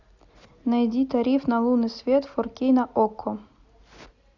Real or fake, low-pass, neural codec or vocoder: real; 7.2 kHz; none